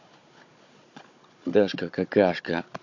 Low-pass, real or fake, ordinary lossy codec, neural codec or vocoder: 7.2 kHz; fake; MP3, 48 kbps; codec, 16 kHz, 16 kbps, FunCodec, trained on Chinese and English, 50 frames a second